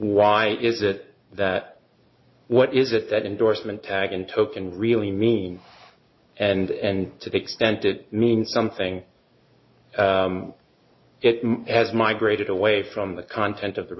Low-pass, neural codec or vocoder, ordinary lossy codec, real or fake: 7.2 kHz; none; MP3, 24 kbps; real